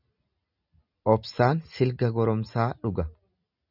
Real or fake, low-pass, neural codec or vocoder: real; 5.4 kHz; none